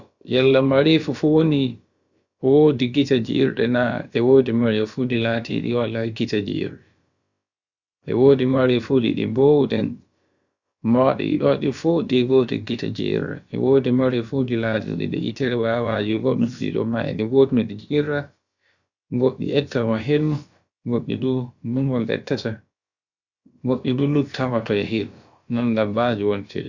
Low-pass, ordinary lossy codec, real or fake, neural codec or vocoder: 7.2 kHz; Opus, 64 kbps; fake; codec, 16 kHz, about 1 kbps, DyCAST, with the encoder's durations